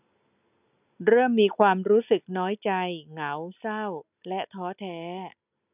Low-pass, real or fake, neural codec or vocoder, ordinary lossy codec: 3.6 kHz; real; none; none